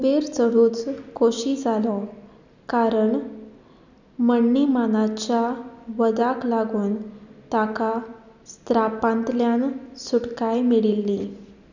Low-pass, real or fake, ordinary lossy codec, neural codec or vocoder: 7.2 kHz; real; none; none